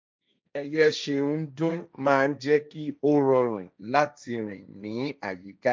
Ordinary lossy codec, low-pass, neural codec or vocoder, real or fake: none; none; codec, 16 kHz, 1.1 kbps, Voila-Tokenizer; fake